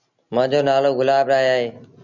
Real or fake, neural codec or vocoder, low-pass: real; none; 7.2 kHz